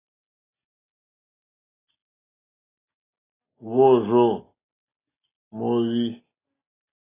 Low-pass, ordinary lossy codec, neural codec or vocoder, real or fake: 3.6 kHz; AAC, 16 kbps; none; real